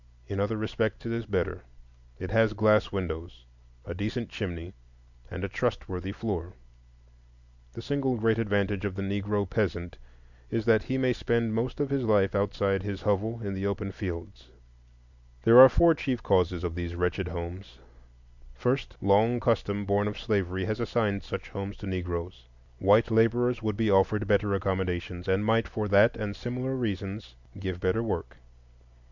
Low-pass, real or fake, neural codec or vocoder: 7.2 kHz; real; none